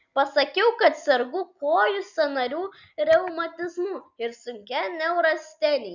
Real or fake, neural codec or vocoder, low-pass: real; none; 7.2 kHz